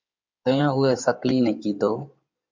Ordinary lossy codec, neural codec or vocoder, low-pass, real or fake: MP3, 64 kbps; codec, 16 kHz in and 24 kHz out, 2.2 kbps, FireRedTTS-2 codec; 7.2 kHz; fake